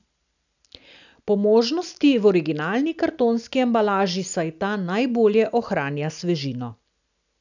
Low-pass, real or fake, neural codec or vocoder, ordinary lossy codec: 7.2 kHz; real; none; none